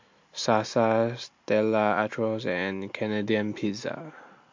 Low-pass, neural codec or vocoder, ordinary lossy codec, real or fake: 7.2 kHz; none; MP3, 48 kbps; real